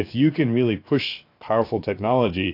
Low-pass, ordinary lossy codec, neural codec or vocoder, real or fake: 5.4 kHz; AAC, 32 kbps; codec, 16 kHz, 0.7 kbps, FocalCodec; fake